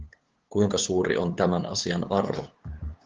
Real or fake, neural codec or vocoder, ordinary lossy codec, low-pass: fake; codec, 16 kHz, 8 kbps, FunCodec, trained on LibriTTS, 25 frames a second; Opus, 16 kbps; 7.2 kHz